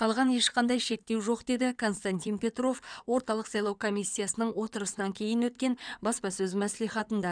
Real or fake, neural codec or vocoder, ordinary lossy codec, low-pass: fake; codec, 16 kHz in and 24 kHz out, 2.2 kbps, FireRedTTS-2 codec; none; 9.9 kHz